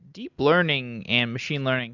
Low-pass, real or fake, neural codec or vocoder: 7.2 kHz; real; none